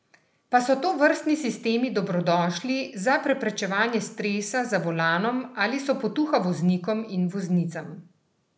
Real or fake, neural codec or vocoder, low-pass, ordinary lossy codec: real; none; none; none